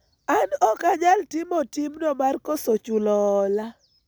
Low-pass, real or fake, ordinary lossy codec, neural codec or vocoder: none; real; none; none